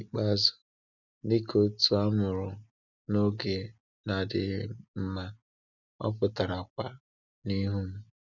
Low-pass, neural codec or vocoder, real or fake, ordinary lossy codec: 7.2 kHz; none; real; none